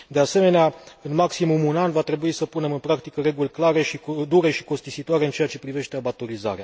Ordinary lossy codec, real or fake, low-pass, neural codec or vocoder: none; real; none; none